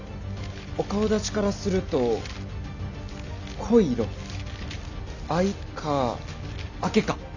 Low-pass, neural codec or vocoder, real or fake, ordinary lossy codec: 7.2 kHz; none; real; none